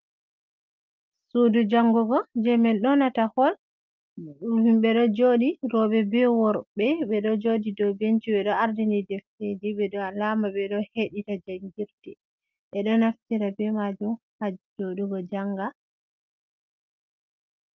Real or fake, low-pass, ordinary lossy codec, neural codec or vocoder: real; 7.2 kHz; Opus, 24 kbps; none